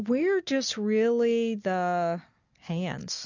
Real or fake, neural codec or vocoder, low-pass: real; none; 7.2 kHz